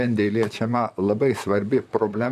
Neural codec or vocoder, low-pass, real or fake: vocoder, 44.1 kHz, 128 mel bands, Pupu-Vocoder; 14.4 kHz; fake